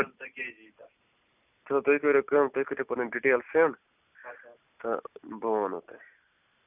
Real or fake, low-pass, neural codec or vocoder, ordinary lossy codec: real; 3.6 kHz; none; none